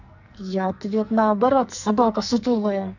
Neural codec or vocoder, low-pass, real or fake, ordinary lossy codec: codec, 44.1 kHz, 2.6 kbps, SNAC; 7.2 kHz; fake; none